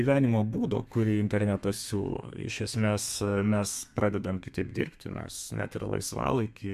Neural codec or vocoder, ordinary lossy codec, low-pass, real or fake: codec, 32 kHz, 1.9 kbps, SNAC; MP3, 96 kbps; 14.4 kHz; fake